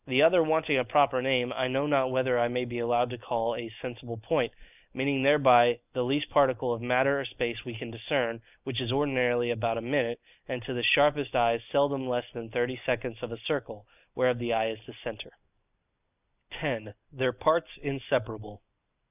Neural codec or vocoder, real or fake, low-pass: none; real; 3.6 kHz